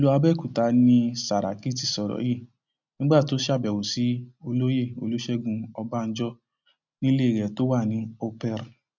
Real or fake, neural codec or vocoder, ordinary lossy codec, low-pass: real; none; none; 7.2 kHz